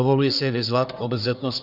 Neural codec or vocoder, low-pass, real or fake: codec, 44.1 kHz, 1.7 kbps, Pupu-Codec; 5.4 kHz; fake